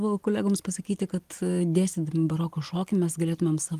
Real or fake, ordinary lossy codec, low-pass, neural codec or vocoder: real; Opus, 24 kbps; 14.4 kHz; none